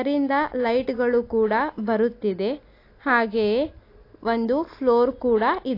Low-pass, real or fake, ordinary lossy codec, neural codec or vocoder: 5.4 kHz; real; AAC, 32 kbps; none